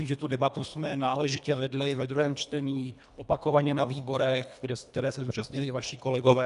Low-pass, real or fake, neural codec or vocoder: 10.8 kHz; fake; codec, 24 kHz, 1.5 kbps, HILCodec